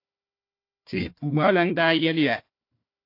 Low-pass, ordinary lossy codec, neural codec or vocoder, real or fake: 5.4 kHz; AAC, 32 kbps; codec, 16 kHz, 1 kbps, FunCodec, trained on Chinese and English, 50 frames a second; fake